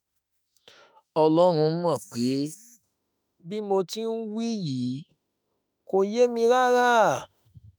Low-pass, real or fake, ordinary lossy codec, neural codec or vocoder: none; fake; none; autoencoder, 48 kHz, 32 numbers a frame, DAC-VAE, trained on Japanese speech